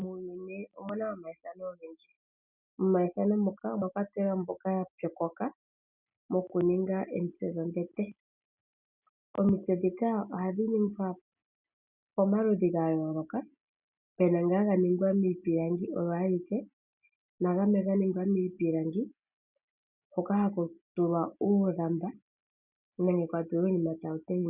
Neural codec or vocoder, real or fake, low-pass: none; real; 3.6 kHz